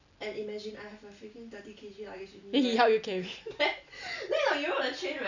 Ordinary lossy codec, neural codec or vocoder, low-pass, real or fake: none; none; 7.2 kHz; real